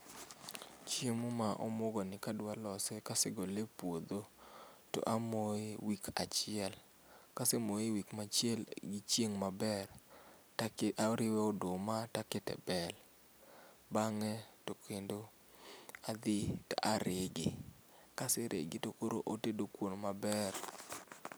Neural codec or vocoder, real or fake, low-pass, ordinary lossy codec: none; real; none; none